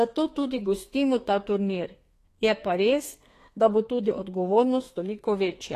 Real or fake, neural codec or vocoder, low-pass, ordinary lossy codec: fake; codec, 32 kHz, 1.9 kbps, SNAC; 14.4 kHz; AAC, 48 kbps